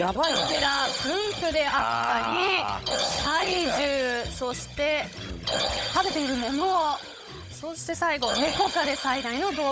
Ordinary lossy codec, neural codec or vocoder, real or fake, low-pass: none; codec, 16 kHz, 16 kbps, FunCodec, trained on Chinese and English, 50 frames a second; fake; none